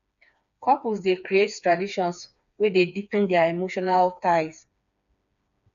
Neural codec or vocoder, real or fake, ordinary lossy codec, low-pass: codec, 16 kHz, 4 kbps, FreqCodec, smaller model; fake; none; 7.2 kHz